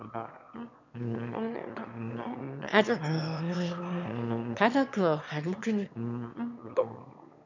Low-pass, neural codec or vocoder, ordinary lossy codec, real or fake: 7.2 kHz; autoencoder, 22.05 kHz, a latent of 192 numbers a frame, VITS, trained on one speaker; none; fake